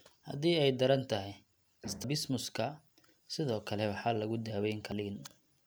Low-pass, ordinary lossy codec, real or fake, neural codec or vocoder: none; none; real; none